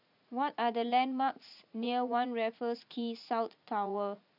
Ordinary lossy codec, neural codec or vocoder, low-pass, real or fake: none; vocoder, 44.1 kHz, 80 mel bands, Vocos; 5.4 kHz; fake